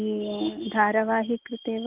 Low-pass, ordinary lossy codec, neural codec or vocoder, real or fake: 3.6 kHz; Opus, 24 kbps; none; real